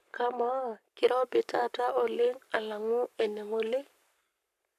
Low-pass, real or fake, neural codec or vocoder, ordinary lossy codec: 14.4 kHz; fake; codec, 44.1 kHz, 7.8 kbps, Pupu-Codec; none